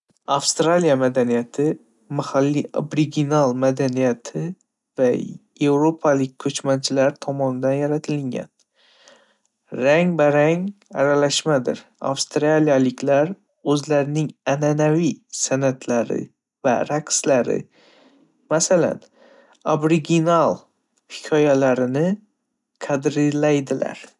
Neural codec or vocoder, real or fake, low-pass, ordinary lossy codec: none; real; 10.8 kHz; none